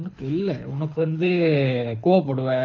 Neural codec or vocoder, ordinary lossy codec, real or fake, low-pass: codec, 24 kHz, 6 kbps, HILCodec; none; fake; 7.2 kHz